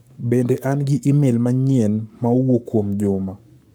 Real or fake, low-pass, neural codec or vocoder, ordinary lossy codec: fake; none; codec, 44.1 kHz, 7.8 kbps, Pupu-Codec; none